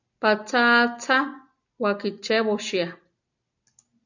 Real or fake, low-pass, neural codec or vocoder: real; 7.2 kHz; none